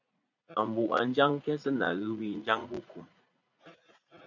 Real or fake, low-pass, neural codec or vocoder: fake; 7.2 kHz; vocoder, 44.1 kHz, 80 mel bands, Vocos